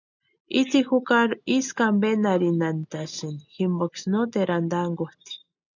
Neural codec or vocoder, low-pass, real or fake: none; 7.2 kHz; real